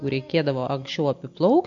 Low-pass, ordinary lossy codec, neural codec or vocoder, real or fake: 7.2 kHz; MP3, 48 kbps; none; real